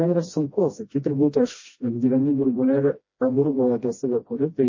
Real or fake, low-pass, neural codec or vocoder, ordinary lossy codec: fake; 7.2 kHz; codec, 16 kHz, 1 kbps, FreqCodec, smaller model; MP3, 32 kbps